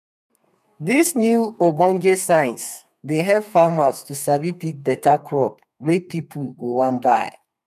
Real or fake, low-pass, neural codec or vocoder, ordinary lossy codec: fake; 14.4 kHz; codec, 32 kHz, 1.9 kbps, SNAC; none